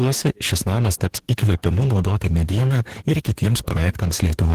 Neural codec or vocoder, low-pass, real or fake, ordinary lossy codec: codec, 44.1 kHz, 2.6 kbps, DAC; 14.4 kHz; fake; Opus, 16 kbps